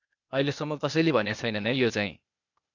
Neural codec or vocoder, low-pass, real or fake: codec, 16 kHz, 0.8 kbps, ZipCodec; 7.2 kHz; fake